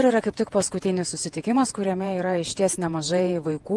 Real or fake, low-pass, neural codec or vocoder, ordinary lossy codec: fake; 10.8 kHz; vocoder, 48 kHz, 128 mel bands, Vocos; Opus, 24 kbps